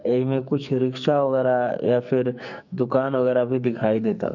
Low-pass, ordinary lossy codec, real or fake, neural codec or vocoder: 7.2 kHz; none; fake; codec, 44.1 kHz, 2.6 kbps, SNAC